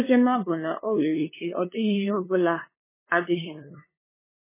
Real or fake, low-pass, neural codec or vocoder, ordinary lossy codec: fake; 3.6 kHz; codec, 16 kHz, 2 kbps, FunCodec, trained on LibriTTS, 25 frames a second; MP3, 16 kbps